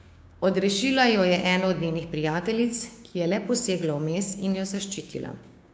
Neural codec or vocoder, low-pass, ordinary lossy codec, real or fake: codec, 16 kHz, 6 kbps, DAC; none; none; fake